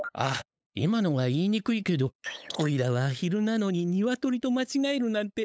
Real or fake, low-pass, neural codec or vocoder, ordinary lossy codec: fake; none; codec, 16 kHz, 8 kbps, FunCodec, trained on LibriTTS, 25 frames a second; none